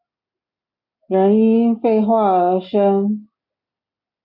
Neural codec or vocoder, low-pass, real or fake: none; 5.4 kHz; real